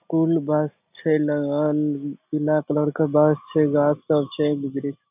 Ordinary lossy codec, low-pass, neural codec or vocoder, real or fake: none; 3.6 kHz; none; real